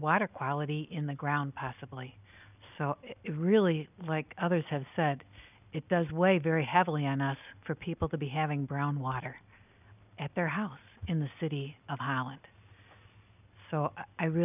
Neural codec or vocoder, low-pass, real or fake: none; 3.6 kHz; real